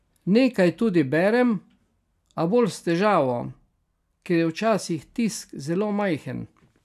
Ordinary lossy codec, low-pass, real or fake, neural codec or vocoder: none; 14.4 kHz; real; none